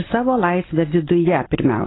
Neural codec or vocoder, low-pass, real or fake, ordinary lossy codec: none; 7.2 kHz; real; AAC, 16 kbps